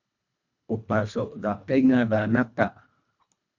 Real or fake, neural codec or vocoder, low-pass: fake; codec, 24 kHz, 1.5 kbps, HILCodec; 7.2 kHz